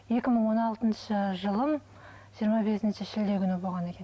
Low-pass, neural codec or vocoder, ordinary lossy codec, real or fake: none; none; none; real